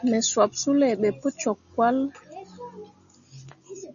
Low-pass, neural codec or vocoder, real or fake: 7.2 kHz; none; real